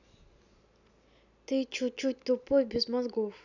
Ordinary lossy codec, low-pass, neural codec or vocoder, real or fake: none; 7.2 kHz; none; real